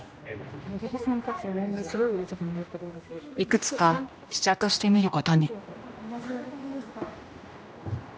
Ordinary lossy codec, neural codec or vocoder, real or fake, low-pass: none; codec, 16 kHz, 1 kbps, X-Codec, HuBERT features, trained on general audio; fake; none